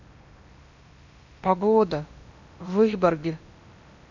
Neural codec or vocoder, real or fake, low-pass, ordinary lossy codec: codec, 16 kHz in and 24 kHz out, 0.8 kbps, FocalCodec, streaming, 65536 codes; fake; 7.2 kHz; none